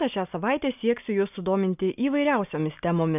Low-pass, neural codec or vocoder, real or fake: 3.6 kHz; none; real